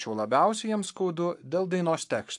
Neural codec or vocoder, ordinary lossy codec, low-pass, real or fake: codec, 44.1 kHz, 7.8 kbps, Pupu-Codec; AAC, 64 kbps; 10.8 kHz; fake